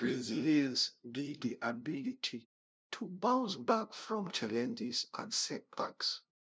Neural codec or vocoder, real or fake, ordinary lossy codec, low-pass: codec, 16 kHz, 0.5 kbps, FunCodec, trained on LibriTTS, 25 frames a second; fake; none; none